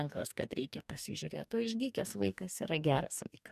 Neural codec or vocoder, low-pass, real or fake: codec, 44.1 kHz, 2.6 kbps, DAC; 14.4 kHz; fake